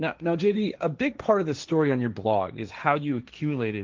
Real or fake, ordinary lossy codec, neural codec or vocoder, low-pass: fake; Opus, 32 kbps; codec, 16 kHz, 1.1 kbps, Voila-Tokenizer; 7.2 kHz